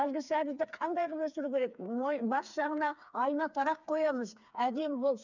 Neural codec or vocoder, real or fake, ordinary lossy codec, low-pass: codec, 16 kHz, 4 kbps, FreqCodec, smaller model; fake; none; 7.2 kHz